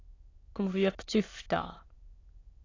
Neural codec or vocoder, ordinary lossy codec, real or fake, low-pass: autoencoder, 22.05 kHz, a latent of 192 numbers a frame, VITS, trained on many speakers; AAC, 32 kbps; fake; 7.2 kHz